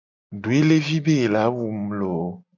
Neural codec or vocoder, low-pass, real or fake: none; 7.2 kHz; real